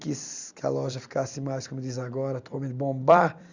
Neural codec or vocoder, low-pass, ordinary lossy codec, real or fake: vocoder, 44.1 kHz, 128 mel bands every 256 samples, BigVGAN v2; 7.2 kHz; Opus, 64 kbps; fake